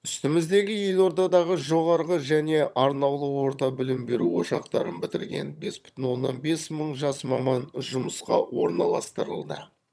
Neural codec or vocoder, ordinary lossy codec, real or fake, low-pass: vocoder, 22.05 kHz, 80 mel bands, HiFi-GAN; none; fake; none